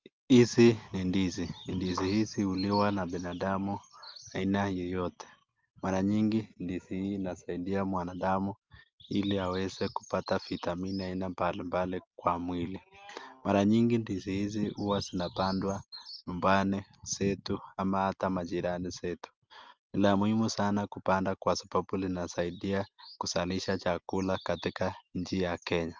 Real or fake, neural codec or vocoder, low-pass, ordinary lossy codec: real; none; 7.2 kHz; Opus, 24 kbps